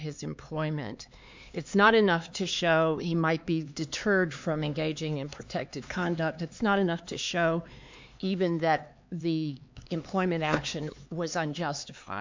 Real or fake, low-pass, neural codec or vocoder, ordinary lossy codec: fake; 7.2 kHz; codec, 16 kHz, 4 kbps, X-Codec, HuBERT features, trained on LibriSpeech; MP3, 64 kbps